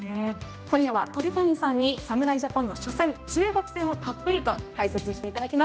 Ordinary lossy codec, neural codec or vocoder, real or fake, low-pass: none; codec, 16 kHz, 1 kbps, X-Codec, HuBERT features, trained on general audio; fake; none